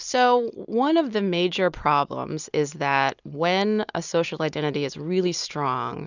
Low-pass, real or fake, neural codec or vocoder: 7.2 kHz; real; none